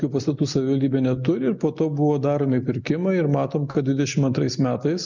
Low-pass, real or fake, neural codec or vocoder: 7.2 kHz; real; none